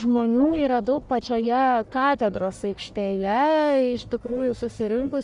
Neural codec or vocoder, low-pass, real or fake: codec, 44.1 kHz, 1.7 kbps, Pupu-Codec; 10.8 kHz; fake